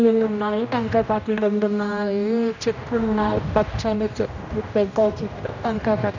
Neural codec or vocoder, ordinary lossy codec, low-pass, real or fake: codec, 16 kHz, 1 kbps, X-Codec, HuBERT features, trained on general audio; none; 7.2 kHz; fake